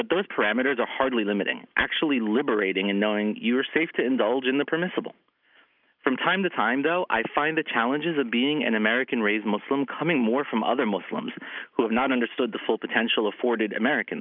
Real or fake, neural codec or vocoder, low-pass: real; none; 5.4 kHz